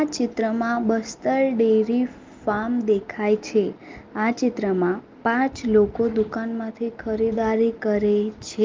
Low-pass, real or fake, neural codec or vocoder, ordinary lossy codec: 7.2 kHz; real; none; Opus, 32 kbps